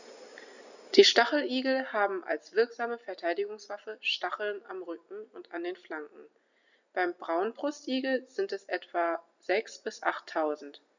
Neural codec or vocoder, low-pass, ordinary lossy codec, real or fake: none; 7.2 kHz; none; real